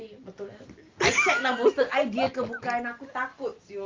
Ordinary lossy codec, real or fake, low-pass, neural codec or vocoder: Opus, 24 kbps; real; 7.2 kHz; none